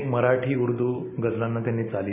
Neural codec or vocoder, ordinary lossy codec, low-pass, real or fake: none; none; 3.6 kHz; real